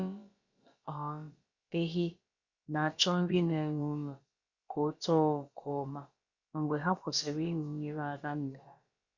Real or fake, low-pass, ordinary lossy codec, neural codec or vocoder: fake; 7.2 kHz; Opus, 64 kbps; codec, 16 kHz, about 1 kbps, DyCAST, with the encoder's durations